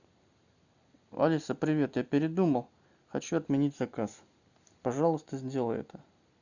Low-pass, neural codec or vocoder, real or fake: 7.2 kHz; none; real